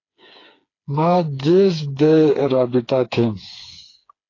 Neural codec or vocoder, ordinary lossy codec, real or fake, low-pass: codec, 16 kHz, 4 kbps, FreqCodec, smaller model; AAC, 32 kbps; fake; 7.2 kHz